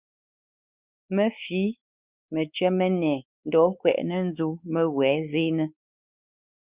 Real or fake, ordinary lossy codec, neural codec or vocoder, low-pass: fake; Opus, 64 kbps; codec, 16 kHz, 4 kbps, X-Codec, WavLM features, trained on Multilingual LibriSpeech; 3.6 kHz